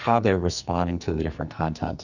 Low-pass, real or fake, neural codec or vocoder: 7.2 kHz; fake; codec, 44.1 kHz, 2.6 kbps, SNAC